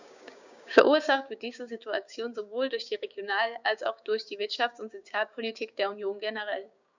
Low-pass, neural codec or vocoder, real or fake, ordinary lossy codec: 7.2 kHz; codec, 16 kHz, 6 kbps, DAC; fake; none